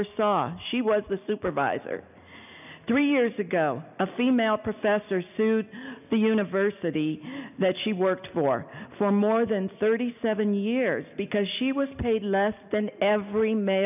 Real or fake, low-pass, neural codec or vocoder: real; 3.6 kHz; none